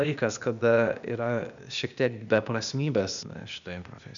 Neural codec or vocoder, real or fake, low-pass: codec, 16 kHz, 0.8 kbps, ZipCodec; fake; 7.2 kHz